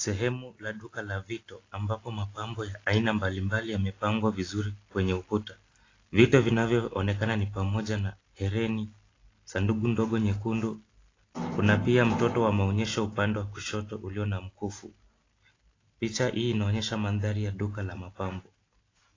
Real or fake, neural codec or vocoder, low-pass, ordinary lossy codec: real; none; 7.2 kHz; AAC, 32 kbps